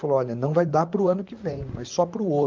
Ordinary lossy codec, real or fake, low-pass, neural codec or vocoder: Opus, 24 kbps; fake; 7.2 kHz; vocoder, 44.1 kHz, 128 mel bands, Pupu-Vocoder